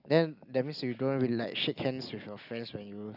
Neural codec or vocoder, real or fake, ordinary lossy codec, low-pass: none; real; none; 5.4 kHz